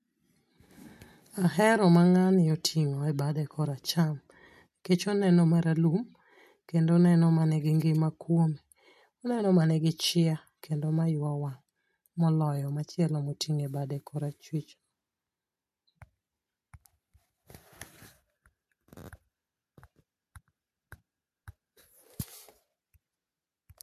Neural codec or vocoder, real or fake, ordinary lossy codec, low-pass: none; real; MP3, 64 kbps; 14.4 kHz